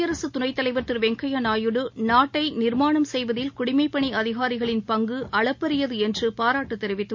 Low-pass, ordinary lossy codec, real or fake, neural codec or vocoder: 7.2 kHz; MP3, 48 kbps; real; none